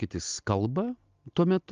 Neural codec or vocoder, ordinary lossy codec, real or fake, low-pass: none; Opus, 32 kbps; real; 7.2 kHz